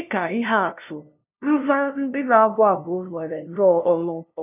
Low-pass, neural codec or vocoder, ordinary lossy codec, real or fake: 3.6 kHz; codec, 16 kHz, 0.5 kbps, FunCodec, trained on LibriTTS, 25 frames a second; none; fake